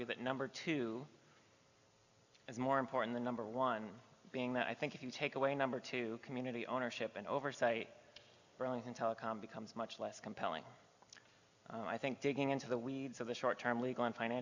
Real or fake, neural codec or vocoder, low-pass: real; none; 7.2 kHz